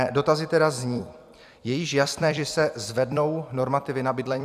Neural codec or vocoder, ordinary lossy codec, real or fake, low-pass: vocoder, 44.1 kHz, 128 mel bands every 256 samples, BigVGAN v2; AAC, 96 kbps; fake; 14.4 kHz